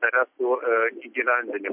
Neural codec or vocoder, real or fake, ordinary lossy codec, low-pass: none; real; MP3, 32 kbps; 3.6 kHz